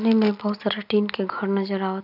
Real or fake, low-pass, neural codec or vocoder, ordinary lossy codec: real; 5.4 kHz; none; none